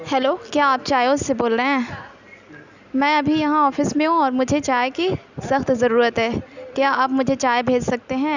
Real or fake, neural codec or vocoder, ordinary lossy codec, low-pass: real; none; none; 7.2 kHz